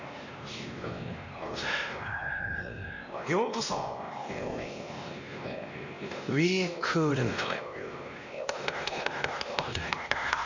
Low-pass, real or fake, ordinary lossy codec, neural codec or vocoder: 7.2 kHz; fake; none; codec, 16 kHz, 1 kbps, X-Codec, WavLM features, trained on Multilingual LibriSpeech